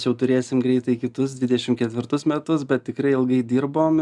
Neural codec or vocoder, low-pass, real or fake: none; 10.8 kHz; real